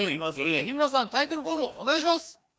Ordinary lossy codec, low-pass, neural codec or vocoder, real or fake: none; none; codec, 16 kHz, 1 kbps, FreqCodec, larger model; fake